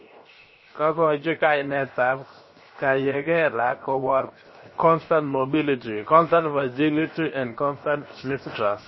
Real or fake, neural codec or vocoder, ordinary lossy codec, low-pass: fake; codec, 16 kHz, 0.7 kbps, FocalCodec; MP3, 24 kbps; 7.2 kHz